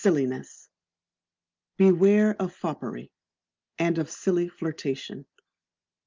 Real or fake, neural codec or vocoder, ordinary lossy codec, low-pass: real; none; Opus, 24 kbps; 7.2 kHz